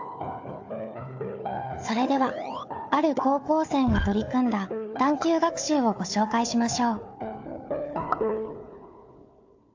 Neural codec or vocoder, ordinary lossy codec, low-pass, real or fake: codec, 16 kHz, 4 kbps, FunCodec, trained on Chinese and English, 50 frames a second; AAC, 48 kbps; 7.2 kHz; fake